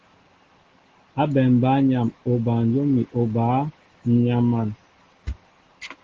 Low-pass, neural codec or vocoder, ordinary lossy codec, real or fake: 7.2 kHz; none; Opus, 16 kbps; real